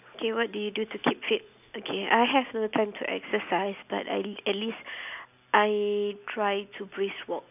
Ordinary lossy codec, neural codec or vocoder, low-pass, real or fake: none; none; 3.6 kHz; real